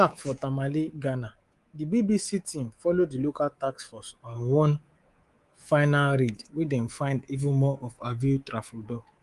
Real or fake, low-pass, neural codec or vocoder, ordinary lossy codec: fake; 10.8 kHz; codec, 24 kHz, 3.1 kbps, DualCodec; Opus, 24 kbps